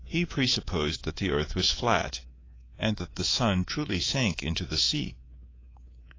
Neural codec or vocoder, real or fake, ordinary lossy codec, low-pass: codec, 24 kHz, 3.1 kbps, DualCodec; fake; AAC, 32 kbps; 7.2 kHz